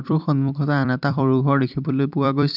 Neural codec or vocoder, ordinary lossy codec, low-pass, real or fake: vocoder, 44.1 kHz, 128 mel bands every 256 samples, BigVGAN v2; none; 5.4 kHz; fake